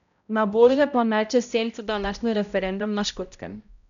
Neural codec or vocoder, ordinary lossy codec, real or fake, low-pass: codec, 16 kHz, 0.5 kbps, X-Codec, HuBERT features, trained on balanced general audio; none; fake; 7.2 kHz